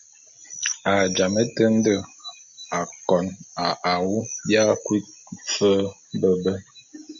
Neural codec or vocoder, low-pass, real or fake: none; 7.2 kHz; real